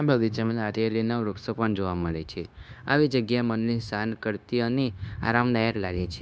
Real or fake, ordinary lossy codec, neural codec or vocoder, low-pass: fake; none; codec, 16 kHz, 0.9 kbps, LongCat-Audio-Codec; none